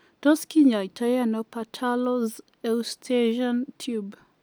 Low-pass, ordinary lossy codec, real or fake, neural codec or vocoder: none; none; real; none